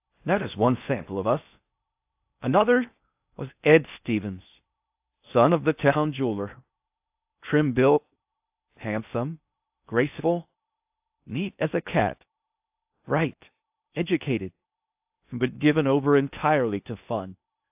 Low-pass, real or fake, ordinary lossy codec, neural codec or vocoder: 3.6 kHz; fake; AAC, 32 kbps; codec, 16 kHz in and 24 kHz out, 0.6 kbps, FocalCodec, streaming, 4096 codes